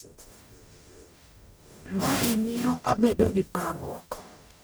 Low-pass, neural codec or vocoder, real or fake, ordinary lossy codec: none; codec, 44.1 kHz, 0.9 kbps, DAC; fake; none